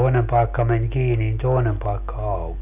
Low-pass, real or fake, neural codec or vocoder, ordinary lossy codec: 3.6 kHz; real; none; none